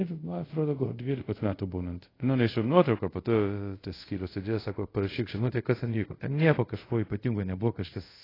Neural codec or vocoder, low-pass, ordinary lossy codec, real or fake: codec, 24 kHz, 0.5 kbps, DualCodec; 5.4 kHz; AAC, 24 kbps; fake